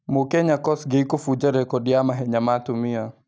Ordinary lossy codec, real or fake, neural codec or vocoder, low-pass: none; real; none; none